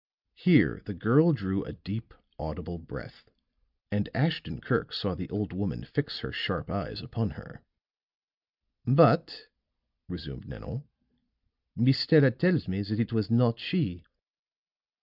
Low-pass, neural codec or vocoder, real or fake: 5.4 kHz; none; real